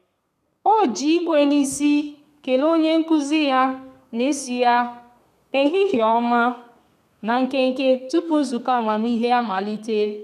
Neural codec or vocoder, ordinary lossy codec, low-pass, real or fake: codec, 32 kHz, 1.9 kbps, SNAC; none; 14.4 kHz; fake